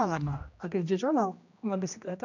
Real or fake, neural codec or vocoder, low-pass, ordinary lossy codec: fake; codec, 16 kHz, 1 kbps, X-Codec, HuBERT features, trained on general audio; 7.2 kHz; none